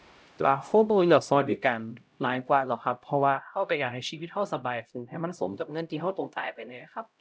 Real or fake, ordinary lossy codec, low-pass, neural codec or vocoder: fake; none; none; codec, 16 kHz, 0.5 kbps, X-Codec, HuBERT features, trained on LibriSpeech